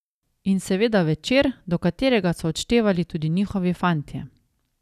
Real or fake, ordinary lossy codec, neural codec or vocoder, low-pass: real; none; none; 14.4 kHz